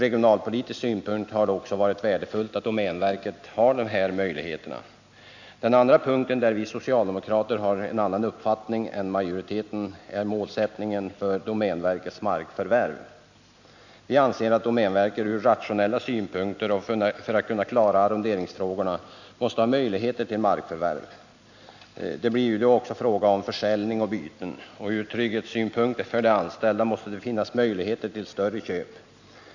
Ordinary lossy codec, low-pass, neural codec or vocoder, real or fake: none; 7.2 kHz; none; real